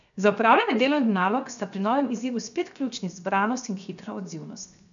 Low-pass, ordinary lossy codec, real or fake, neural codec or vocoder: 7.2 kHz; none; fake; codec, 16 kHz, 0.7 kbps, FocalCodec